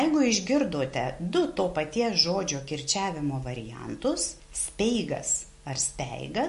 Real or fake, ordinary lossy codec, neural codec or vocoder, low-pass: real; MP3, 48 kbps; none; 14.4 kHz